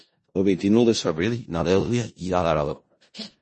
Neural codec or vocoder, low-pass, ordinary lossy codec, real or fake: codec, 16 kHz in and 24 kHz out, 0.4 kbps, LongCat-Audio-Codec, four codebook decoder; 10.8 kHz; MP3, 32 kbps; fake